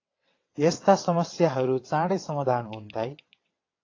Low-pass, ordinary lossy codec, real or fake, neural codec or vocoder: 7.2 kHz; AAC, 32 kbps; fake; vocoder, 22.05 kHz, 80 mel bands, WaveNeXt